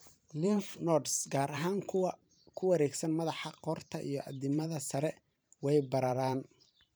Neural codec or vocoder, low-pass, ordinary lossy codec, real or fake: vocoder, 44.1 kHz, 128 mel bands every 512 samples, BigVGAN v2; none; none; fake